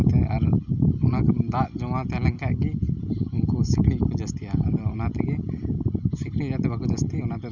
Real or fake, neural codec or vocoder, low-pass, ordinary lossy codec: real; none; 7.2 kHz; none